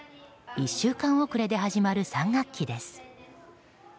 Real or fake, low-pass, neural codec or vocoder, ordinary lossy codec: real; none; none; none